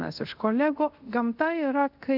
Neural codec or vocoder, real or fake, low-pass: codec, 24 kHz, 0.5 kbps, DualCodec; fake; 5.4 kHz